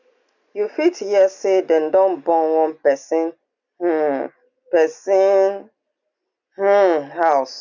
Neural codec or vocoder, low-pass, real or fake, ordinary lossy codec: none; 7.2 kHz; real; none